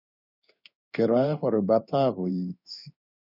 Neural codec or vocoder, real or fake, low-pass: codec, 16 kHz in and 24 kHz out, 1 kbps, XY-Tokenizer; fake; 5.4 kHz